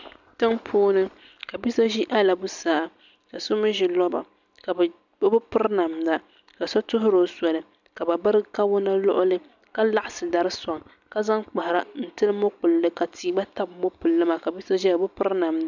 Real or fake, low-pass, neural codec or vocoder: real; 7.2 kHz; none